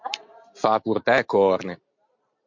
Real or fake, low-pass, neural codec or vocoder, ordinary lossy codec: real; 7.2 kHz; none; MP3, 64 kbps